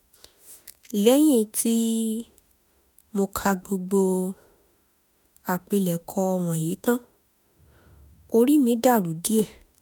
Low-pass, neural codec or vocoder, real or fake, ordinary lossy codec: none; autoencoder, 48 kHz, 32 numbers a frame, DAC-VAE, trained on Japanese speech; fake; none